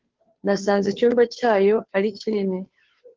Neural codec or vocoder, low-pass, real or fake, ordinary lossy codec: codec, 16 kHz, 2 kbps, FunCodec, trained on Chinese and English, 25 frames a second; 7.2 kHz; fake; Opus, 16 kbps